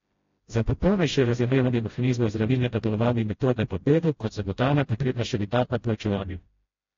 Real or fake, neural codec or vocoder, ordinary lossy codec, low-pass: fake; codec, 16 kHz, 0.5 kbps, FreqCodec, smaller model; AAC, 32 kbps; 7.2 kHz